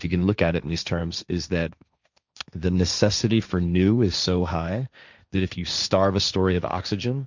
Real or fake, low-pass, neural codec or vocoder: fake; 7.2 kHz; codec, 16 kHz, 1.1 kbps, Voila-Tokenizer